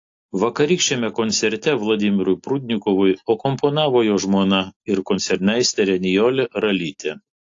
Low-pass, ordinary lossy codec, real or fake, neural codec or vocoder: 7.2 kHz; AAC, 48 kbps; real; none